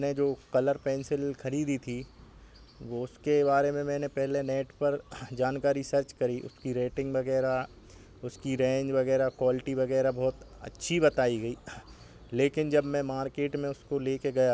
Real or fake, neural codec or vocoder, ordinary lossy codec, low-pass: real; none; none; none